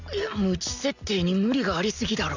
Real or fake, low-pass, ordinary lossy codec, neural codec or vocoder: real; 7.2 kHz; none; none